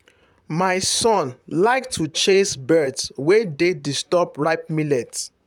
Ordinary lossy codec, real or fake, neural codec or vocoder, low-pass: none; fake; vocoder, 44.1 kHz, 128 mel bands, Pupu-Vocoder; 19.8 kHz